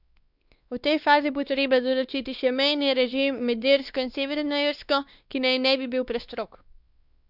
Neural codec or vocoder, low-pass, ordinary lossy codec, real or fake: codec, 16 kHz, 2 kbps, X-Codec, WavLM features, trained on Multilingual LibriSpeech; 5.4 kHz; Opus, 64 kbps; fake